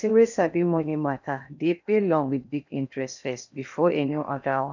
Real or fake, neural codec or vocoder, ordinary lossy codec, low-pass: fake; codec, 16 kHz in and 24 kHz out, 0.8 kbps, FocalCodec, streaming, 65536 codes; none; 7.2 kHz